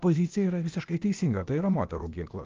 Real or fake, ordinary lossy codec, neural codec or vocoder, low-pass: fake; Opus, 32 kbps; codec, 16 kHz, 0.8 kbps, ZipCodec; 7.2 kHz